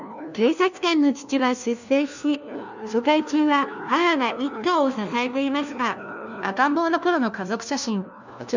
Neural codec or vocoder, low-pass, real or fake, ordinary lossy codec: codec, 16 kHz, 1 kbps, FunCodec, trained on LibriTTS, 50 frames a second; 7.2 kHz; fake; none